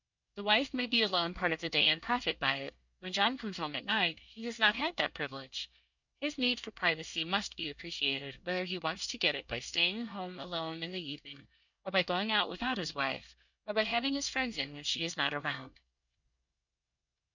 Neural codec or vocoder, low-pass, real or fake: codec, 24 kHz, 1 kbps, SNAC; 7.2 kHz; fake